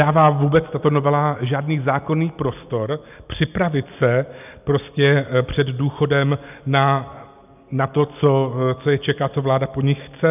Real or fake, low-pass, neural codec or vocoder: real; 3.6 kHz; none